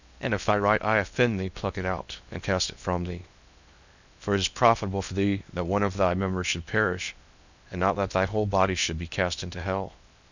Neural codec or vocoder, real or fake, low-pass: codec, 16 kHz in and 24 kHz out, 0.8 kbps, FocalCodec, streaming, 65536 codes; fake; 7.2 kHz